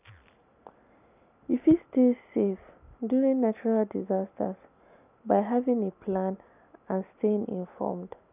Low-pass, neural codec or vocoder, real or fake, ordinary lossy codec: 3.6 kHz; none; real; none